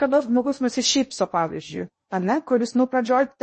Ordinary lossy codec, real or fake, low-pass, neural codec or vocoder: MP3, 32 kbps; fake; 10.8 kHz; codec, 16 kHz in and 24 kHz out, 0.6 kbps, FocalCodec, streaming, 2048 codes